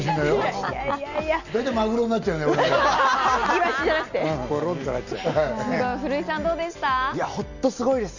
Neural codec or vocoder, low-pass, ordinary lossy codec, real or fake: none; 7.2 kHz; none; real